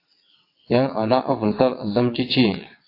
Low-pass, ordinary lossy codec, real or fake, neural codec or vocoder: 5.4 kHz; AAC, 32 kbps; fake; vocoder, 22.05 kHz, 80 mel bands, WaveNeXt